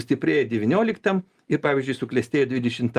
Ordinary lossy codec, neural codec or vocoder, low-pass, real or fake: Opus, 32 kbps; none; 14.4 kHz; real